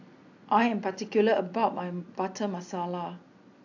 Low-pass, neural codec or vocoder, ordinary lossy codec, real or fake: 7.2 kHz; none; AAC, 48 kbps; real